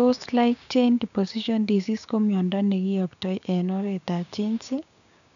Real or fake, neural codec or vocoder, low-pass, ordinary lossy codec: fake; codec, 16 kHz, 6 kbps, DAC; 7.2 kHz; none